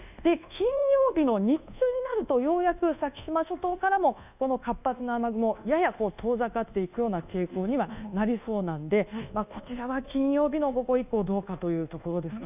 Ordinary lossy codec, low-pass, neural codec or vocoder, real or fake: none; 3.6 kHz; codec, 24 kHz, 1.2 kbps, DualCodec; fake